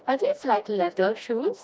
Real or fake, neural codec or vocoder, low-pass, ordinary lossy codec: fake; codec, 16 kHz, 1 kbps, FreqCodec, smaller model; none; none